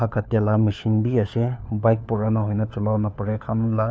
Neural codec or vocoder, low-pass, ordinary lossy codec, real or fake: codec, 16 kHz, 4 kbps, FunCodec, trained on LibriTTS, 50 frames a second; none; none; fake